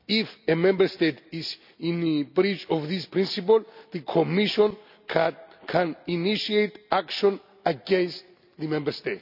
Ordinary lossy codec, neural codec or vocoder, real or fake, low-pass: none; none; real; 5.4 kHz